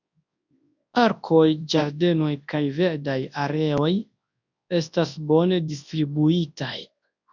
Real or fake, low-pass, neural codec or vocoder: fake; 7.2 kHz; codec, 24 kHz, 0.9 kbps, WavTokenizer, large speech release